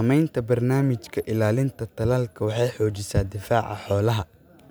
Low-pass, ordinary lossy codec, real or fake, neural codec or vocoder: none; none; real; none